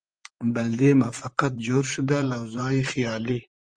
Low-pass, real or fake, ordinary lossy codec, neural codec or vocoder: 9.9 kHz; fake; Opus, 24 kbps; vocoder, 44.1 kHz, 128 mel bands, Pupu-Vocoder